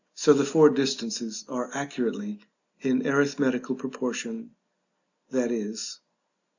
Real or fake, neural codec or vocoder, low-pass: real; none; 7.2 kHz